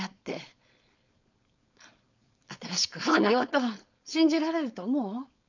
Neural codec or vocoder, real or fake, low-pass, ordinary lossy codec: codec, 16 kHz, 4.8 kbps, FACodec; fake; 7.2 kHz; none